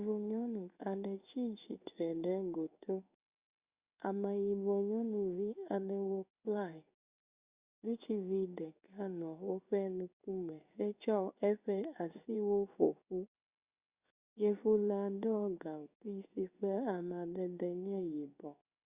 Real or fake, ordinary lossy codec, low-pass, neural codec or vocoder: fake; Opus, 64 kbps; 3.6 kHz; codec, 16 kHz in and 24 kHz out, 1 kbps, XY-Tokenizer